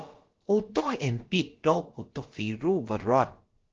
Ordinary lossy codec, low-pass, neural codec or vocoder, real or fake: Opus, 16 kbps; 7.2 kHz; codec, 16 kHz, about 1 kbps, DyCAST, with the encoder's durations; fake